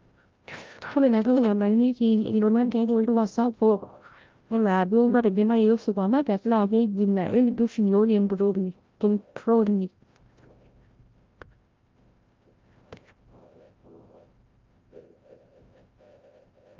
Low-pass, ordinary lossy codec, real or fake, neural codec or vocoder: 7.2 kHz; Opus, 32 kbps; fake; codec, 16 kHz, 0.5 kbps, FreqCodec, larger model